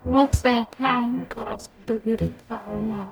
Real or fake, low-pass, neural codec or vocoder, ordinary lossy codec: fake; none; codec, 44.1 kHz, 0.9 kbps, DAC; none